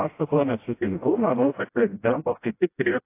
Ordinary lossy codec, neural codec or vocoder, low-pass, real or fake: AAC, 24 kbps; codec, 16 kHz, 0.5 kbps, FreqCodec, smaller model; 3.6 kHz; fake